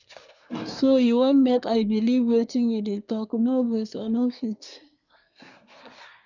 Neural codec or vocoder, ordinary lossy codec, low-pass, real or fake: codec, 24 kHz, 1 kbps, SNAC; none; 7.2 kHz; fake